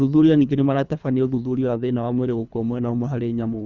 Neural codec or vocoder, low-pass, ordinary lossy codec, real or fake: codec, 24 kHz, 3 kbps, HILCodec; 7.2 kHz; none; fake